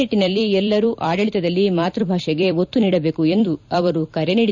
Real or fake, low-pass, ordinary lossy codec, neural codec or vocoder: fake; 7.2 kHz; none; vocoder, 44.1 kHz, 128 mel bands every 256 samples, BigVGAN v2